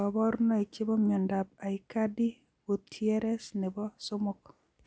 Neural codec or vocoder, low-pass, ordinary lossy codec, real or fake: none; none; none; real